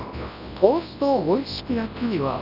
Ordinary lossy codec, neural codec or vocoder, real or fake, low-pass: none; codec, 24 kHz, 0.9 kbps, WavTokenizer, large speech release; fake; 5.4 kHz